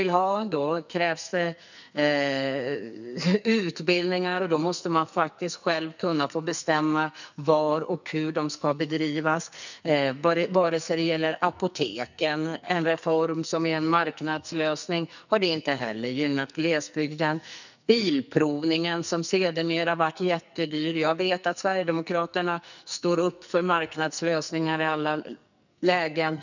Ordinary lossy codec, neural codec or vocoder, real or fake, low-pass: none; codec, 44.1 kHz, 2.6 kbps, SNAC; fake; 7.2 kHz